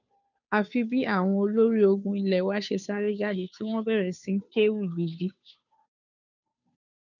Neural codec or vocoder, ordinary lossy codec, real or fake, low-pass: codec, 16 kHz, 2 kbps, FunCodec, trained on Chinese and English, 25 frames a second; none; fake; 7.2 kHz